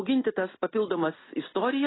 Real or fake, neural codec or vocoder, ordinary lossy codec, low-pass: real; none; AAC, 16 kbps; 7.2 kHz